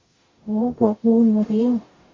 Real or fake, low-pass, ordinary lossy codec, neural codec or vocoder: fake; 7.2 kHz; MP3, 32 kbps; codec, 44.1 kHz, 0.9 kbps, DAC